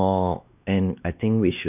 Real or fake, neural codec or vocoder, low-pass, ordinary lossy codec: fake; codec, 16 kHz, 2 kbps, X-Codec, WavLM features, trained on Multilingual LibriSpeech; 3.6 kHz; none